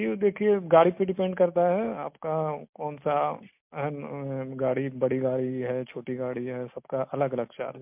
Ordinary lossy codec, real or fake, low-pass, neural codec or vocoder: MP3, 32 kbps; real; 3.6 kHz; none